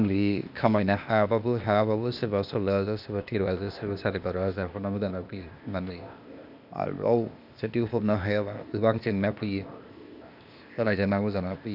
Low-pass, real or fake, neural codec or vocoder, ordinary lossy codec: 5.4 kHz; fake; codec, 16 kHz, 0.8 kbps, ZipCodec; none